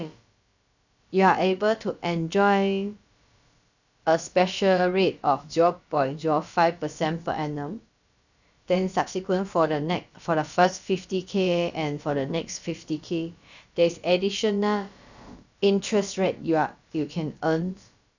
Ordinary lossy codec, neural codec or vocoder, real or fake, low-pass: none; codec, 16 kHz, about 1 kbps, DyCAST, with the encoder's durations; fake; 7.2 kHz